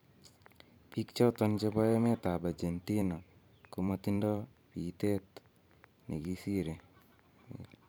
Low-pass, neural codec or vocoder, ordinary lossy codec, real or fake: none; vocoder, 44.1 kHz, 128 mel bands every 512 samples, BigVGAN v2; none; fake